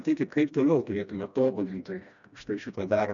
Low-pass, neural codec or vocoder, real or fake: 7.2 kHz; codec, 16 kHz, 1 kbps, FreqCodec, smaller model; fake